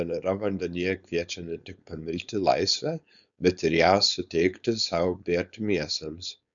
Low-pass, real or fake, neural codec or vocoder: 7.2 kHz; fake; codec, 16 kHz, 4.8 kbps, FACodec